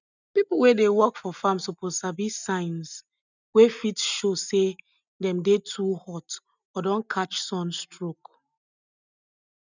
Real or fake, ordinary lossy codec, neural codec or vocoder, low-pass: real; none; none; 7.2 kHz